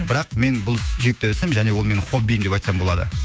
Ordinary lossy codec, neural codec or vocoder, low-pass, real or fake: none; codec, 16 kHz, 6 kbps, DAC; none; fake